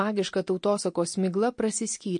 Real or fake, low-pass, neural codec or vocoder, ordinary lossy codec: real; 9.9 kHz; none; MP3, 48 kbps